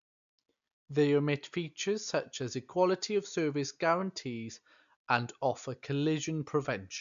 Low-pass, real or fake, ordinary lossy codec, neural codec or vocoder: 7.2 kHz; real; AAC, 96 kbps; none